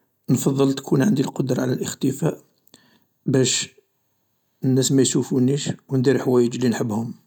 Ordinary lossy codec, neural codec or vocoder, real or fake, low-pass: none; none; real; 19.8 kHz